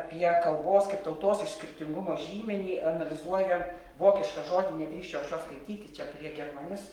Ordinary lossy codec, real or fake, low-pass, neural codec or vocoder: Opus, 32 kbps; fake; 19.8 kHz; codec, 44.1 kHz, 7.8 kbps, Pupu-Codec